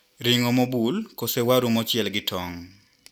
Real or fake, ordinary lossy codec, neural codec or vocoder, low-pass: real; none; none; 19.8 kHz